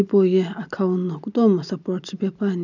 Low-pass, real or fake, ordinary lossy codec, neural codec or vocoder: 7.2 kHz; real; none; none